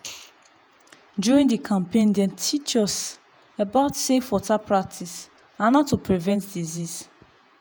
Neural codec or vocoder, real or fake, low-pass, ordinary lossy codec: vocoder, 48 kHz, 128 mel bands, Vocos; fake; none; none